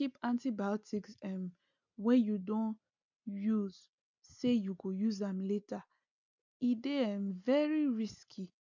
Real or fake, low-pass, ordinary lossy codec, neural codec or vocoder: real; 7.2 kHz; none; none